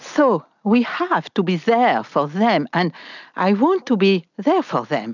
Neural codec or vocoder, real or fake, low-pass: none; real; 7.2 kHz